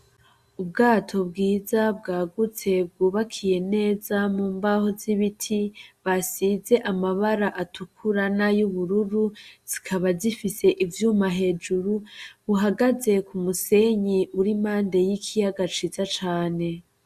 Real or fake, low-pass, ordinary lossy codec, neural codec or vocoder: real; 14.4 kHz; Opus, 64 kbps; none